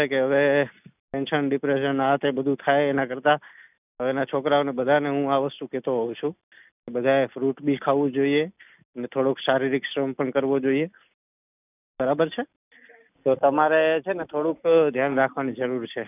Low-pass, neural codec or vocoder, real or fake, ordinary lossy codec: 3.6 kHz; none; real; none